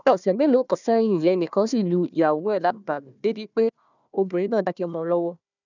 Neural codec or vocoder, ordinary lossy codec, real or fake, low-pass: codec, 16 kHz, 1 kbps, FunCodec, trained on Chinese and English, 50 frames a second; none; fake; 7.2 kHz